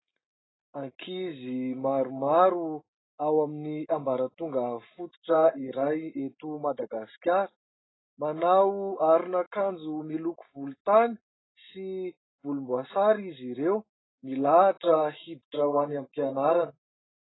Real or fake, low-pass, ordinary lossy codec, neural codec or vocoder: real; 7.2 kHz; AAC, 16 kbps; none